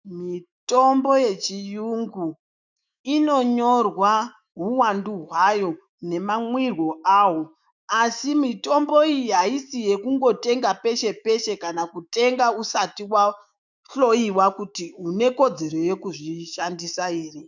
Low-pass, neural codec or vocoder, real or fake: 7.2 kHz; autoencoder, 48 kHz, 128 numbers a frame, DAC-VAE, trained on Japanese speech; fake